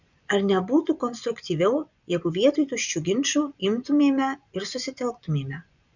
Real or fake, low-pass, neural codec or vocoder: fake; 7.2 kHz; vocoder, 24 kHz, 100 mel bands, Vocos